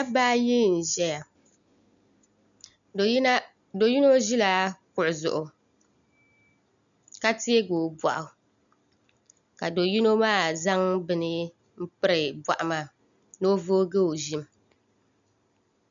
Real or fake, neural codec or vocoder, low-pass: real; none; 7.2 kHz